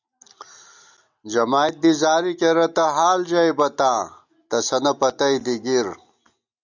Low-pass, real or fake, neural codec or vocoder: 7.2 kHz; real; none